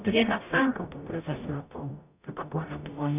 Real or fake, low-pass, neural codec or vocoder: fake; 3.6 kHz; codec, 44.1 kHz, 0.9 kbps, DAC